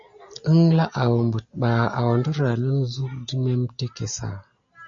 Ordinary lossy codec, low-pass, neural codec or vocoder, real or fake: MP3, 48 kbps; 7.2 kHz; none; real